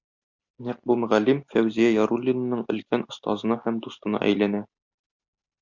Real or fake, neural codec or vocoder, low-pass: real; none; 7.2 kHz